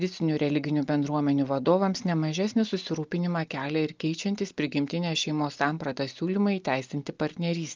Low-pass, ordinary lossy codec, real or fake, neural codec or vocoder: 7.2 kHz; Opus, 24 kbps; real; none